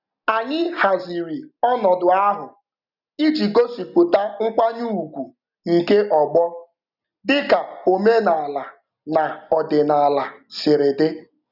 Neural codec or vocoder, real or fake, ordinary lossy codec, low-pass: none; real; none; 5.4 kHz